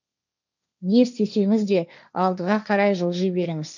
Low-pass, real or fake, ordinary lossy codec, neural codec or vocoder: 7.2 kHz; fake; none; codec, 16 kHz, 1.1 kbps, Voila-Tokenizer